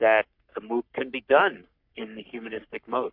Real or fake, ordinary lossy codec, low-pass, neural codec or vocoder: fake; MP3, 48 kbps; 5.4 kHz; codec, 44.1 kHz, 3.4 kbps, Pupu-Codec